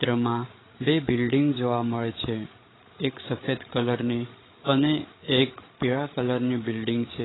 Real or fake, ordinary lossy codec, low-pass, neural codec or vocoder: fake; AAC, 16 kbps; 7.2 kHz; codec, 16 kHz, 8 kbps, FreqCodec, larger model